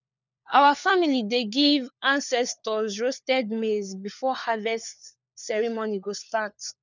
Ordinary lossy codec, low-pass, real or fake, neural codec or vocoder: none; 7.2 kHz; fake; codec, 16 kHz, 4 kbps, FunCodec, trained on LibriTTS, 50 frames a second